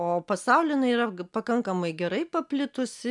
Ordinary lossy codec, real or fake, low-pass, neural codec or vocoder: MP3, 96 kbps; real; 10.8 kHz; none